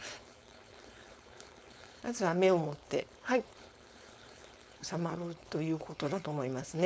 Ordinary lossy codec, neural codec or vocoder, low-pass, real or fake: none; codec, 16 kHz, 4.8 kbps, FACodec; none; fake